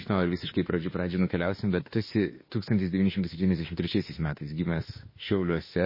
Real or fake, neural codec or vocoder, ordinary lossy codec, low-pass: fake; autoencoder, 48 kHz, 32 numbers a frame, DAC-VAE, trained on Japanese speech; MP3, 24 kbps; 5.4 kHz